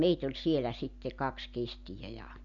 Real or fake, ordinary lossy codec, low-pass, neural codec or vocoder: real; none; 7.2 kHz; none